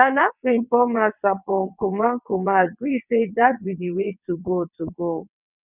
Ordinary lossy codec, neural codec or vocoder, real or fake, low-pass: none; vocoder, 22.05 kHz, 80 mel bands, WaveNeXt; fake; 3.6 kHz